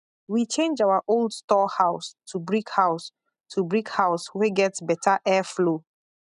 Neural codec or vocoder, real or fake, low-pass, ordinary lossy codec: none; real; 10.8 kHz; none